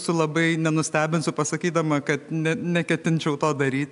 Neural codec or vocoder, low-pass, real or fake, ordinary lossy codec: none; 10.8 kHz; real; MP3, 96 kbps